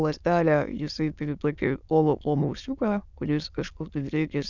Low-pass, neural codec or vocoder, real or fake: 7.2 kHz; autoencoder, 22.05 kHz, a latent of 192 numbers a frame, VITS, trained on many speakers; fake